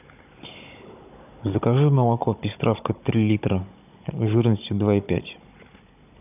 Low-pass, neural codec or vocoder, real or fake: 3.6 kHz; codec, 16 kHz, 16 kbps, FunCodec, trained on Chinese and English, 50 frames a second; fake